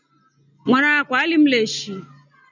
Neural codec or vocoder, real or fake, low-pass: none; real; 7.2 kHz